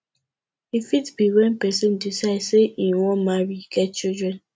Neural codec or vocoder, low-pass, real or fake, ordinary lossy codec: none; none; real; none